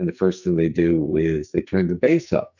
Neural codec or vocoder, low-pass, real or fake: codec, 44.1 kHz, 2.6 kbps, SNAC; 7.2 kHz; fake